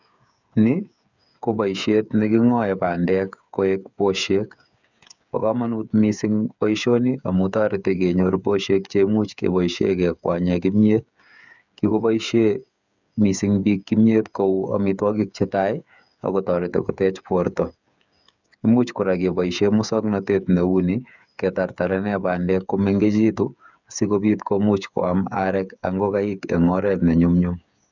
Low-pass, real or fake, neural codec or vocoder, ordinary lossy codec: 7.2 kHz; fake; codec, 16 kHz, 8 kbps, FreqCodec, smaller model; none